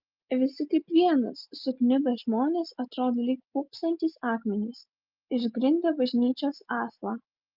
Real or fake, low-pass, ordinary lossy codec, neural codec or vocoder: real; 5.4 kHz; Opus, 24 kbps; none